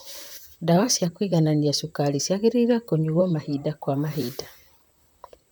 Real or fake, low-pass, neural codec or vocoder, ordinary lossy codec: fake; none; vocoder, 44.1 kHz, 128 mel bands, Pupu-Vocoder; none